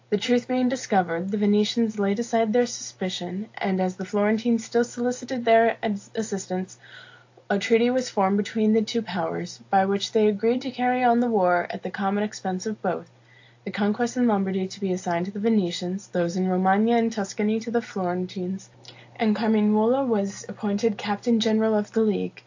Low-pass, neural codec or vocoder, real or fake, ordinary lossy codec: 7.2 kHz; none; real; AAC, 48 kbps